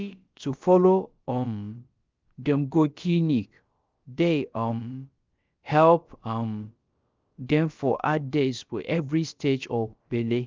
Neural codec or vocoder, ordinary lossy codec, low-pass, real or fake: codec, 16 kHz, about 1 kbps, DyCAST, with the encoder's durations; Opus, 24 kbps; 7.2 kHz; fake